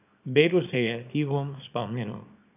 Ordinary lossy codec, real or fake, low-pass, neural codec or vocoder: none; fake; 3.6 kHz; codec, 24 kHz, 0.9 kbps, WavTokenizer, small release